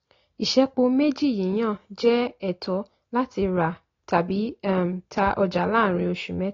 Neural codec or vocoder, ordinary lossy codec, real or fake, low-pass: none; AAC, 32 kbps; real; 7.2 kHz